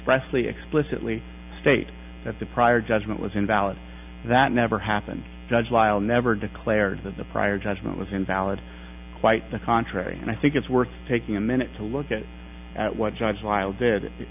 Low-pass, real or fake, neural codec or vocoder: 3.6 kHz; real; none